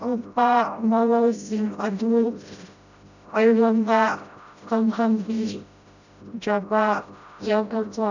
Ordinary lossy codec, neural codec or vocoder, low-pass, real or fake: none; codec, 16 kHz, 0.5 kbps, FreqCodec, smaller model; 7.2 kHz; fake